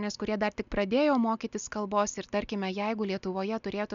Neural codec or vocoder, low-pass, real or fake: none; 7.2 kHz; real